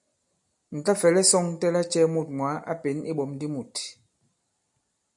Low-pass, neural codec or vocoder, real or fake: 10.8 kHz; none; real